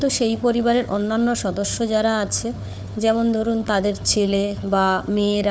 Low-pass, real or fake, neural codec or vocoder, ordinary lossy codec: none; fake; codec, 16 kHz, 4 kbps, FunCodec, trained on Chinese and English, 50 frames a second; none